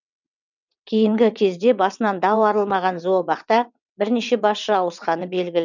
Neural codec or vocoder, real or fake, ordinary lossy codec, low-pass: vocoder, 22.05 kHz, 80 mel bands, WaveNeXt; fake; none; 7.2 kHz